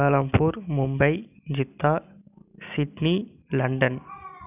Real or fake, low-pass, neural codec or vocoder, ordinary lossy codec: real; 3.6 kHz; none; none